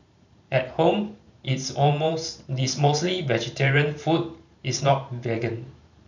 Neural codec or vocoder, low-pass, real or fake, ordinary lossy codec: vocoder, 44.1 kHz, 128 mel bands every 512 samples, BigVGAN v2; 7.2 kHz; fake; none